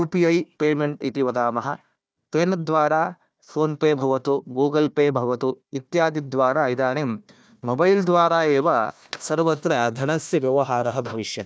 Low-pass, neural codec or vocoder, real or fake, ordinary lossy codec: none; codec, 16 kHz, 1 kbps, FunCodec, trained on Chinese and English, 50 frames a second; fake; none